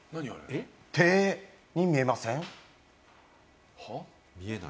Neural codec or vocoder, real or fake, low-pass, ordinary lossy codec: none; real; none; none